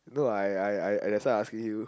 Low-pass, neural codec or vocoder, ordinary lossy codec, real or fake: none; none; none; real